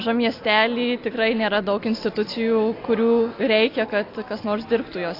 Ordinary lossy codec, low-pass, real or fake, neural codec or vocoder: AAC, 32 kbps; 5.4 kHz; real; none